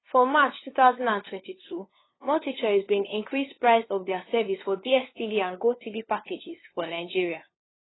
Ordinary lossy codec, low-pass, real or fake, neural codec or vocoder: AAC, 16 kbps; 7.2 kHz; fake; codec, 16 kHz, 2 kbps, FunCodec, trained on LibriTTS, 25 frames a second